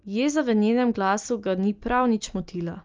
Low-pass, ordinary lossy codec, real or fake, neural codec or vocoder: 7.2 kHz; Opus, 24 kbps; real; none